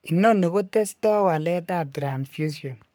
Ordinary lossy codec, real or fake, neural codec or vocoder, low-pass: none; fake; codec, 44.1 kHz, 3.4 kbps, Pupu-Codec; none